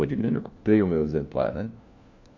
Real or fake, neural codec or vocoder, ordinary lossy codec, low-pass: fake; codec, 16 kHz, 1 kbps, FunCodec, trained on LibriTTS, 50 frames a second; MP3, 48 kbps; 7.2 kHz